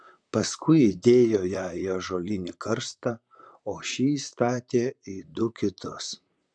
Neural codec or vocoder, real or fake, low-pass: vocoder, 44.1 kHz, 128 mel bands, Pupu-Vocoder; fake; 9.9 kHz